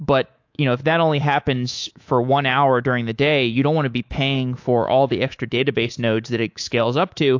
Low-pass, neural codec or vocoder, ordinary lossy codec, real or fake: 7.2 kHz; codec, 24 kHz, 3.1 kbps, DualCodec; AAC, 48 kbps; fake